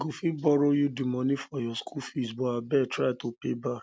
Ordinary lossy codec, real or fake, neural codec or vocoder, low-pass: none; real; none; none